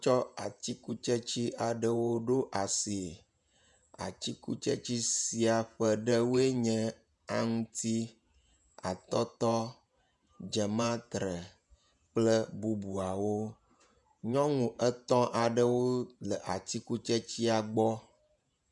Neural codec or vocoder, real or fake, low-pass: vocoder, 48 kHz, 128 mel bands, Vocos; fake; 10.8 kHz